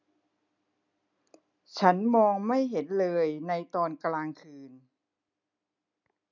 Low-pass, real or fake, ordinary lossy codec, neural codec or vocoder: 7.2 kHz; real; none; none